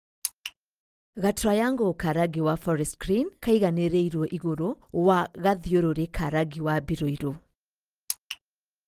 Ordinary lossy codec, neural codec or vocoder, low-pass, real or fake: Opus, 24 kbps; none; 14.4 kHz; real